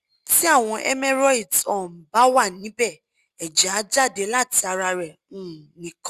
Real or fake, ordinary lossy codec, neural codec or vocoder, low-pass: real; Opus, 64 kbps; none; 14.4 kHz